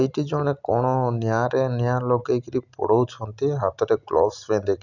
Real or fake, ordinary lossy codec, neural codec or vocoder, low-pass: real; none; none; 7.2 kHz